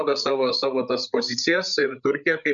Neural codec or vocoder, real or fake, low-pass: codec, 16 kHz, 8 kbps, FreqCodec, larger model; fake; 7.2 kHz